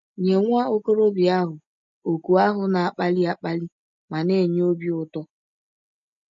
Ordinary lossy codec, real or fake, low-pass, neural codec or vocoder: MP3, 48 kbps; real; 7.2 kHz; none